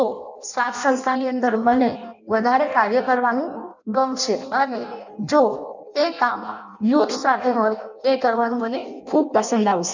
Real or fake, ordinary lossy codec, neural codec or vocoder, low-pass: fake; none; codec, 16 kHz in and 24 kHz out, 0.6 kbps, FireRedTTS-2 codec; 7.2 kHz